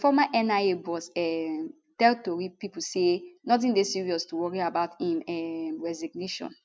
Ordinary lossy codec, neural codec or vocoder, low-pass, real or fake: none; none; none; real